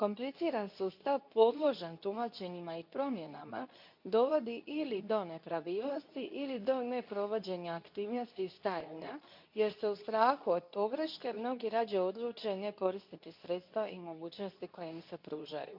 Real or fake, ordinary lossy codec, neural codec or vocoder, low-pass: fake; none; codec, 24 kHz, 0.9 kbps, WavTokenizer, medium speech release version 2; 5.4 kHz